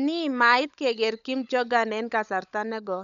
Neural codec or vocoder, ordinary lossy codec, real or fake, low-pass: codec, 16 kHz, 16 kbps, FunCodec, trained on LibriTTS, 50 frames a second; none; fake; 7.2 kHz